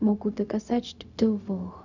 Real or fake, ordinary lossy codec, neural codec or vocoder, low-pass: fake; none; codec, 16 kHz, 0.4 kbps, LongCat-Audio-Codec; 7.2 kHz